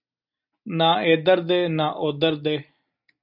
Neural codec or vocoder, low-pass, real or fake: none; 5.4 kHz; real